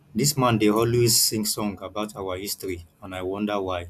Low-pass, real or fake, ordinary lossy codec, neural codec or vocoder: 14.4 kHz; real; none; none